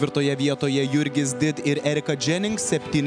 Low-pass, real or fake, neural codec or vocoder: 9.9 kHz; real; none